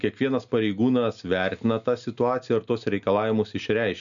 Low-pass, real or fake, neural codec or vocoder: 7.2 kHz; real; none